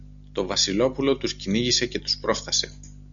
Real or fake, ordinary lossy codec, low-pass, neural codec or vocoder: real; MP3, 96 kbps; 7.2 kHz; none